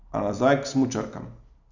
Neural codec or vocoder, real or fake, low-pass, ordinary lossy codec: none; real; 7.2 kHz; none